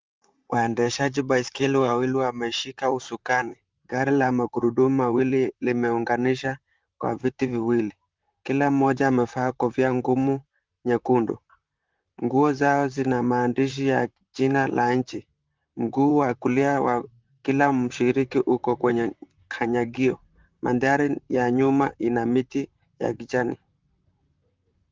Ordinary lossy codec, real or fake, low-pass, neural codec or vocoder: Opus, 32 kbps; fake; 7.2 kHz; vocoder, 44.1 kHz, 128 mel bands, Pupu-Vocoder